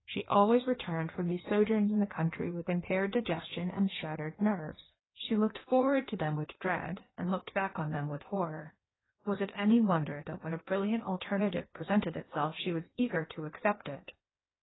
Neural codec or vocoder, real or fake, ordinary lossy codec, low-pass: codec, 16 kHz in and 24 kHz out, 1.1 kbps, FireRedTTS-2 codec; fake; AAC, 16 kbps; 7.2 kHz